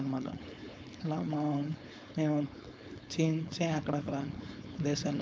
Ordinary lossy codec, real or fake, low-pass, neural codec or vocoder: none; fake; none; codec, 16 kHz, 4.8 kbps, FACodec